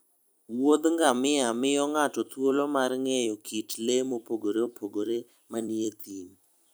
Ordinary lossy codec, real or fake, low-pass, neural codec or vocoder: none; fake; none; vocoder, 44.1 kHz, 128 mel bands every 256 samples, BigVGAN v2